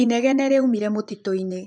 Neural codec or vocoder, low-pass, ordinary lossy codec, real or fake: vocoder, 24 kHz, 100 mel bands, Vocos; 9.9 kHz; none; fake